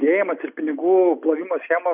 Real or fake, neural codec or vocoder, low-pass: real; none; 3.6 kHz